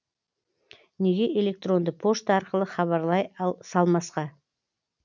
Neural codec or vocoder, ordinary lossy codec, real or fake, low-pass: none; none; real; 7.2 kHz